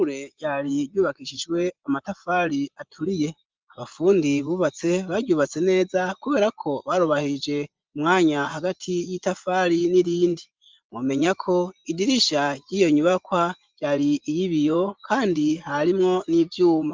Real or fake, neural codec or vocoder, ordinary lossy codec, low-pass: fake; vocoder, 24 kHz, 100 mel bands, Vocos; Opus, 32 kbps; 7.2 kHz